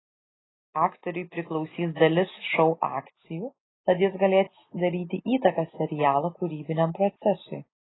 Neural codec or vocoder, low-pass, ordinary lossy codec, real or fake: none; 7.2 kHz; AAC, 16 kbps; real